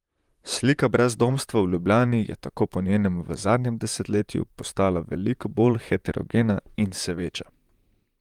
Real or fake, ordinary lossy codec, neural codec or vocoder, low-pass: fake; Opus, 32 kbps; vocoder, 44.1 kHz, 128 mel bands, Pupu-Vocoder; 19.8 kHz